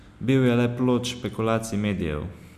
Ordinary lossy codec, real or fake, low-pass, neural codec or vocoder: none; real; 14.4 kHz; none